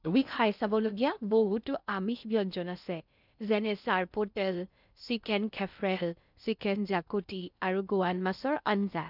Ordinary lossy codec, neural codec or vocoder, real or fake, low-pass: none; codec, 16 kHz in and 24 kHz out, 0.6 kbps, FocalCodec, streaming, 2048 codes; fake; 5.4 kHz